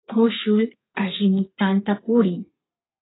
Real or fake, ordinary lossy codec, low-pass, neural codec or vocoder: fake; AAC, 16 kbps; 7.2 kHz; codec, 32 kHz, 1.9 kbps, SNAC